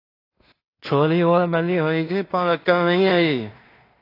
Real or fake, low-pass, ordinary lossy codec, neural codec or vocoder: fake; 5.4 kHz; AAC, 24 kbps; codec, 16 kHz in and 24 kHz out, 0.4 kbps, LongCat-Audio-Codec, two codebook decoder